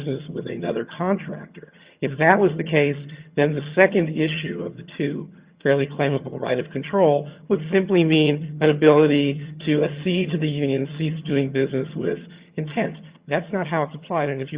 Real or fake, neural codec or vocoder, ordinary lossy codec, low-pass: fake; vocoder, 22.05 kHz, 80 mel bands, HiFi-GAN; Opus, 64 kbps; 3.6 kHz